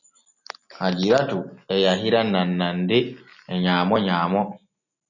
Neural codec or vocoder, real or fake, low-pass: none; real; 7.2 kHz